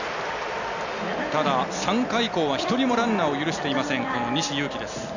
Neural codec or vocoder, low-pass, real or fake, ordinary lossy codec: none; 7.2 kHz; real; none